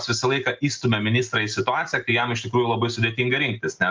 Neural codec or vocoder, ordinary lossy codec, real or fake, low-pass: none; Opus, 32 kbps; real; 7.2 kHz